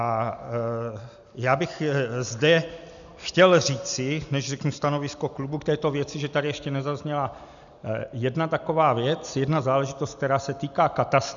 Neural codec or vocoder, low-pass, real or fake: none; 7.2 kHz; real